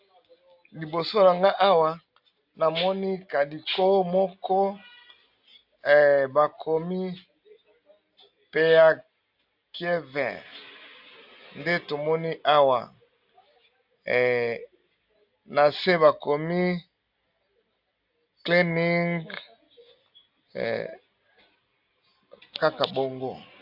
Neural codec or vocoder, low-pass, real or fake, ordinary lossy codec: none; 5.4 kHz; real; AAC, 48 kbps